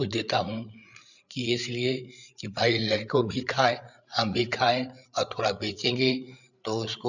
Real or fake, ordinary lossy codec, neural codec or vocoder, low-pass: fake; none; codec, 16 kHz, 8 kbps, FreqCodec, larger model; 7.2 kHz